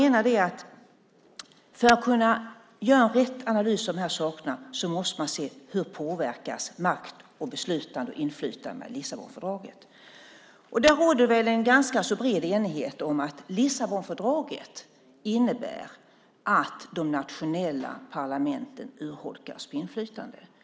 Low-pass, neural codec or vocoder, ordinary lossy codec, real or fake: none; none; none; real